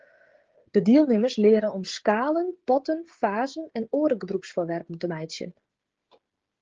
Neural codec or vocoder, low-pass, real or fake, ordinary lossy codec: codec, 16 kHz, 16 kbps, FreqCodec, smaller model; 7.2 kHz; fake; Opus, 16 kbps